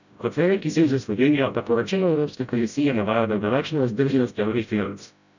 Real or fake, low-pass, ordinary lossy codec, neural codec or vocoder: fake; 7.2 kHz; none; codec, 16 kHz, 0.5 kbps, FreqCodec, smaller model